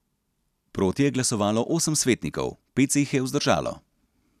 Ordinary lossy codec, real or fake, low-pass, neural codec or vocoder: none; real; 14.4 kHz; none